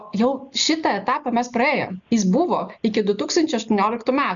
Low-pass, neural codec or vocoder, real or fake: 7.2 kHz; none; real